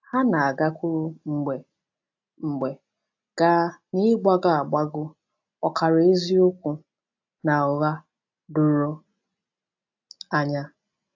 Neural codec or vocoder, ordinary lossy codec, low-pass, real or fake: none; AAC, 48 kbps; 7.2 kHz; real